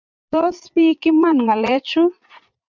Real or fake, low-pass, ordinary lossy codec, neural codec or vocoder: fake; 7.2 kHz; MP3, 64 kbps; vocoder, 22.05 kHz, 80 mel bands, Vocos